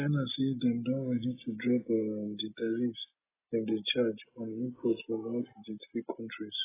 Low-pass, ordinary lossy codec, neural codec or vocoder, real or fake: 3.6 kHz; AAC, 16 kbps; none; real